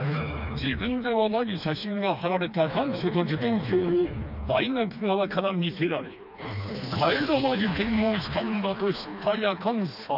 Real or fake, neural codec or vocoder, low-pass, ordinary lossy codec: fake; codec, 16 kHz, 2 kbps, FreqCodec, smaller model; 5.4 kHz; none